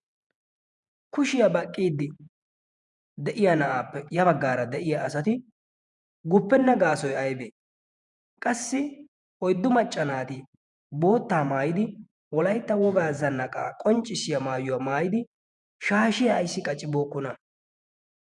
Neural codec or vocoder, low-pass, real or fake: vocoder, 48 kHz, 128 mel bands, Vocos; 10.8 kHz; fake